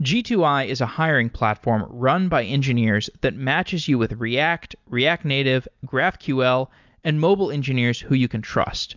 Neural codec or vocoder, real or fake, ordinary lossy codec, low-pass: none; real; MP3, 64 kbps; 7.2 kHz